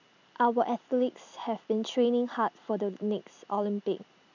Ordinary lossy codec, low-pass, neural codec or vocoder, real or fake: none; 7.2 kHz; none; real